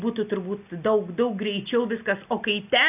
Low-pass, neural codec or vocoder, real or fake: 3.6 kHz; none; real